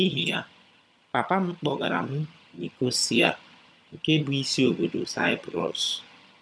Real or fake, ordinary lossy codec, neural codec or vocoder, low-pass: fake; none; vocoder, 22.05 kHz, 80 mel bands, HiFi-GAN; none